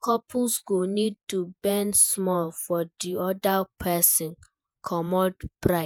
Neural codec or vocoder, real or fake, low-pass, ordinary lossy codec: vocoder, 48 kHz, 128 mel bands, Vocos; fake; none; none